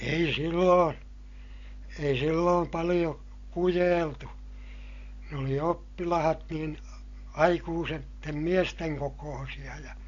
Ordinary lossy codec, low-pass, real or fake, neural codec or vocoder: AAC, 48 kbps; 7.2 kHz; real; none